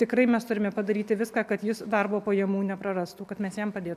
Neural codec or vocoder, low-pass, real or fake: none; 14.4 kHz; real